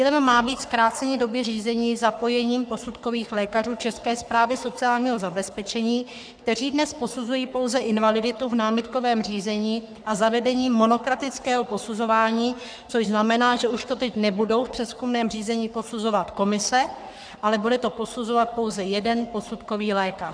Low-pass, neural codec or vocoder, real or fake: 9.9 kHz; codec, 44.1 kHz, 3.4 kbps, Pupu-Codec; fake